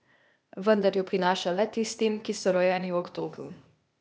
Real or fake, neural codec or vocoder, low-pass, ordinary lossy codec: fake; codec, 16 kHz, 0.8 kbps, ZipCodec; none; none